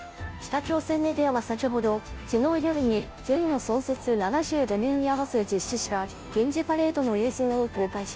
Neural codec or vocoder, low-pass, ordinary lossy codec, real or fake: codec, 16 kHz, 0.5 kbps, FunCodec, trained on Chinese and English, 25 frames a second; none; none; fake